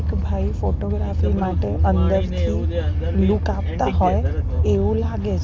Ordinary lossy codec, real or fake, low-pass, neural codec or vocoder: none; real; none; none